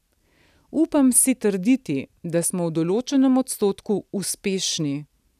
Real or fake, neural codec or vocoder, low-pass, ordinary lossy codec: real; none; 14.4 kHz; none